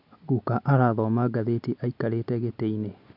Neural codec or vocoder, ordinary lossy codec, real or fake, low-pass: none; MP3, 48 kbps; real; 5.4 kHz